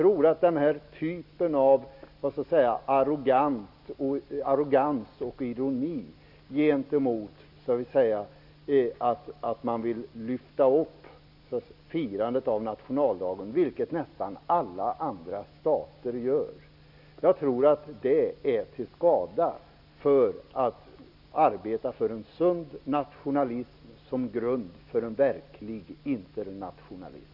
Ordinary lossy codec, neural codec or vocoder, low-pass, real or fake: none; none; 5.4 kHz; real